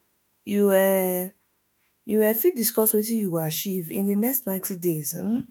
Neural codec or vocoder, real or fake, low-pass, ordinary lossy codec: autoencoder, 48 kHz, 32 numbers a frame, DAC-VAE, trained on Japanese speech; fake; none; none